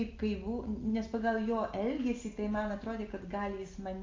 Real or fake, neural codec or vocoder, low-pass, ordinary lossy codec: real; none; 7.2 kHz; Opus, 32 kbps